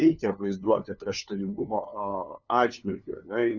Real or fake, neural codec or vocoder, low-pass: fake; codec, 16 kHz, 2 kbps, FunCodec, trained on LibriTTS, 25 frames a second; 7.2 kHz